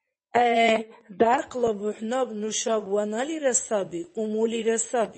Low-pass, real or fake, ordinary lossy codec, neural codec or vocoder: 9.9 kHz; fake; MP3, 32 kbps; vocoder, 22.05 kHz, 80 mel bands, WaveNeXt